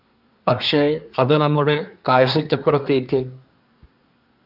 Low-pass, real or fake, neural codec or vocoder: 5.4 kHz; fake; codec, 24 kHz, 1 kbps, SNAC